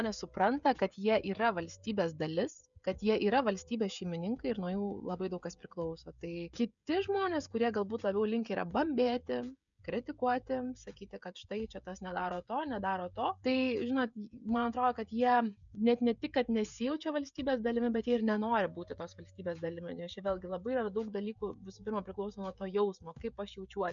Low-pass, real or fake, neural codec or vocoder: 7.2 kHz; fake; codec, 16 kHz, 16 kbps, FreqCodec, smaller model